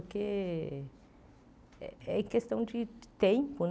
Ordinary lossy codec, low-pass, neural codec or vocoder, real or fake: none; none; none; real